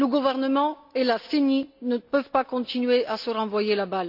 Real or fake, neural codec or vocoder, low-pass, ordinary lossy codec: real; none; 5.4 kHz; none